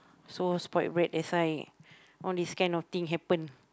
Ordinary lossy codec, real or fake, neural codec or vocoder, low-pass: none; real; none; none